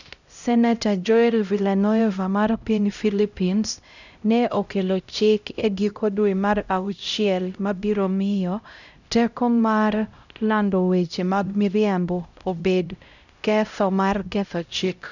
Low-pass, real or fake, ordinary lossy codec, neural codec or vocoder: 7.2 kHz; fake; none; codec, 16 kHz, 0.5 kbps, X-Codec, HuBERT features, trained on LibriSpeech